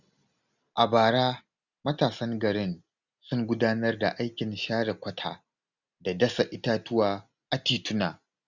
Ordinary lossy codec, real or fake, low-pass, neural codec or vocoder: none; real; 7.2 kHz; none